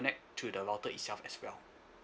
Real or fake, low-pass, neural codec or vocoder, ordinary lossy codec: real; none; none; none